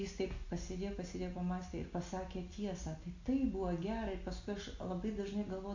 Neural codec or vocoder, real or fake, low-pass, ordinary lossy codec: none; real; 7.2 kHz; AAC, 48 kbps